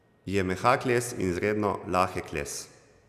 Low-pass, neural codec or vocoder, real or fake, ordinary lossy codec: 14.4 kHz; none; real; none